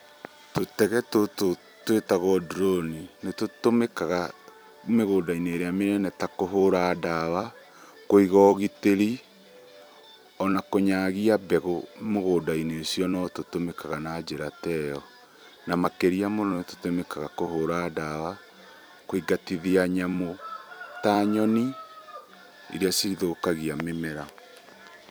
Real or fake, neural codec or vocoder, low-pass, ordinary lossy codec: fake; vocoder, 44.1 kHz, 128 mel bands every 256 samples, BigVGAN v2; none; none